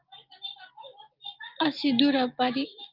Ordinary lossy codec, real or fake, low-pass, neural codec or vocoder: Opus, 32 kbps; real; 5.4 kHz; none